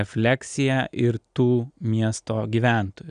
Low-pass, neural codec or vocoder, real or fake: 9.9 kHz; none; real